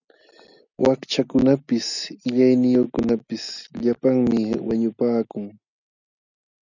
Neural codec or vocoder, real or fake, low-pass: none; real; 7.2 kHz